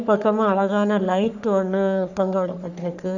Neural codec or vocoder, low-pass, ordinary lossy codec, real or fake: codec, 44.1 kHz, 3.4 kbps, Pupu-Codec; 7.2 kHz; none; fake